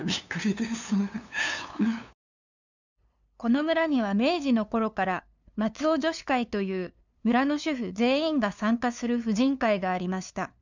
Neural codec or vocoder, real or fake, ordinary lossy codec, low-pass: codec, 16 kHz, 2 kbps, FunCodec, trained on LibriTTS, 25 frames a second; fake; none; 7.2 kHz